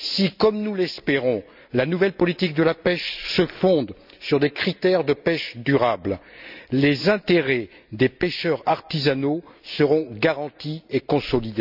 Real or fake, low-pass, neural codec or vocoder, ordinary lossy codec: real; 5.4 kHz; none; none